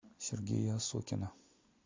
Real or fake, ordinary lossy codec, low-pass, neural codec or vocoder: real; MP3, 48 kbps; 7.2 kHz; none